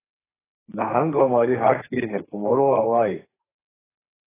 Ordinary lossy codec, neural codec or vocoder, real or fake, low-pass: AAC, 16 kbps; codec, 32 kHz, 1.9 kbps, SNAC; fake; 3.6 kHz